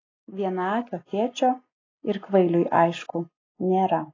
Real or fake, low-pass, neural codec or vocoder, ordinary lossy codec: real; 7.2 kHz; none; AAC, 32 kbps